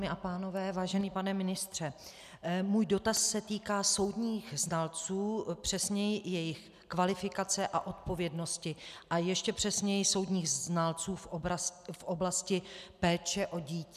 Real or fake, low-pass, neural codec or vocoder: real; 14.4 kHz; none